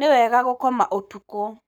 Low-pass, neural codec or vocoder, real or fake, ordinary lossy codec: none; codec, 44.1 kHz, 7.8 kbps, Pupu-Codec; fake; none